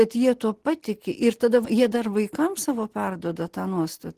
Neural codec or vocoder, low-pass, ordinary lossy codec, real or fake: none; 14.4 kHz; Opus, 16 kbps; real